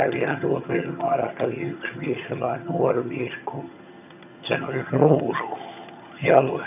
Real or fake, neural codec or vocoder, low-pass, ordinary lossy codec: fake; vocoder, 22.05 kHz, 80 mel bands, HiFi-GAN; 3.6 kHz; none